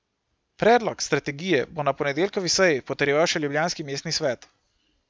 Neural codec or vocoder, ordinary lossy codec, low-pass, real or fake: none; none; none; real